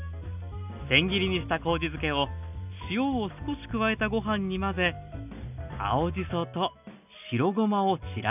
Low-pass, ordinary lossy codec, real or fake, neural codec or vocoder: 3.6 kHz; none; real; none